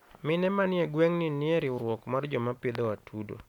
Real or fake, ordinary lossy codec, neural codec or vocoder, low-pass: real; none; none; 19.8 kHz